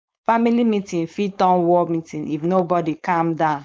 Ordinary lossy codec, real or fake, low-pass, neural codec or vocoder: none; fake; none; codec, 16 kHz, 4.8 kbps, FACodec